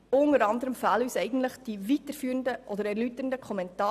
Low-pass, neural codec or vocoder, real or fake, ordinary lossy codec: 14.4 kHz; none; real; none